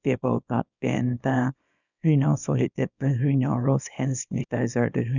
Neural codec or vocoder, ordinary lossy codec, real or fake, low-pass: codec, 24 kHz, 0.9 kbps, WavTokenizer, small release; none; fake; 7.2 kHz